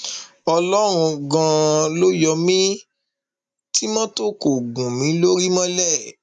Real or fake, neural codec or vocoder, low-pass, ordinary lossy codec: real; none; 10.8 kHz; none